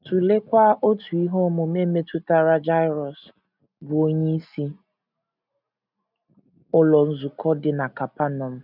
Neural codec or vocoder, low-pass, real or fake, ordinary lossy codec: none; 5.4 kHz; real; none